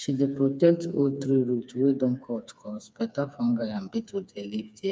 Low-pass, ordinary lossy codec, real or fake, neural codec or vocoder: none; none; fake; codec, 16 kHz, 4 kbps, FreqCodec, smaller model